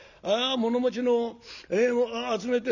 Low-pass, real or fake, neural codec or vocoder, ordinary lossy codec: 7.2 kHz; real; none; none